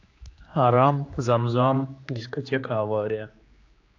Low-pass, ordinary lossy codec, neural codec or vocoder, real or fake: 7.2 kHz; AAC, 48 kbps; codec, 16 kHz, 2 kbps, X-Codec, HuBERT features, trained on general audio; fake